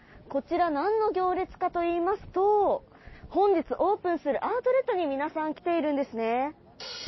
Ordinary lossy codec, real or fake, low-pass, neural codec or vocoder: MP3, 24 kbps; real; 7.2 kHz; none